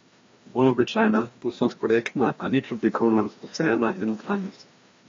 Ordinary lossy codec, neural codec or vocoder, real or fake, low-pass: AAC, 32 kbps; codec, 16 kHz, 1 kbps, FreqCodec, larger model; fake; 7.2 kHz